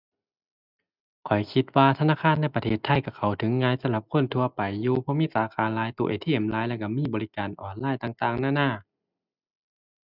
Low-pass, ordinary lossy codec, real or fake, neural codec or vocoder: 5.4 kHz; none; real; none